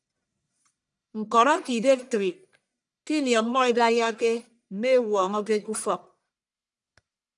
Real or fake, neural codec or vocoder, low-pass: fake; codec, 44.1 kHz, 1.7 kbps, Pupu-Codec; 10.8 kHz